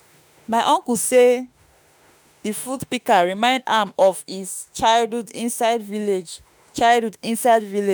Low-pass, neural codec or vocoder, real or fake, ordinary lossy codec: none; autoencoder, 48 kHz, 32 numbers a frame, DAC-VAE, trained on Japanese speech; fake; none